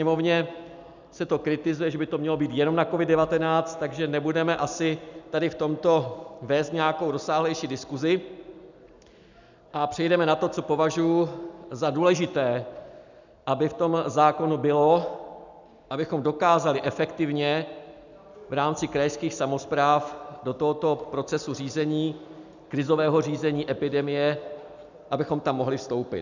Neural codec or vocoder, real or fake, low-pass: none; real; 7.2 kHz